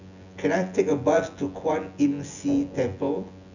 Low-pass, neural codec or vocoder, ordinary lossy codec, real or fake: 7.2 kHz; vocoder, 24 kHz, 100 mel bands, Vocos; none; fake